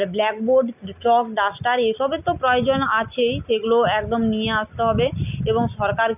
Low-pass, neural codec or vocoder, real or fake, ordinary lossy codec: 3.6 kHz; none; real; none